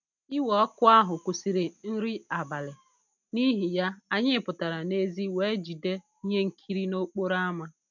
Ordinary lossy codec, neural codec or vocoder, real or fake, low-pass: none; none; real; 7.2 kHz